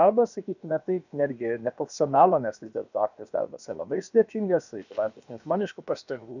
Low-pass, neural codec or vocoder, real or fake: 7.2 kHz; codec, 16 kHz, about 1 kbps, DyCAST, with the encoder's durations; fake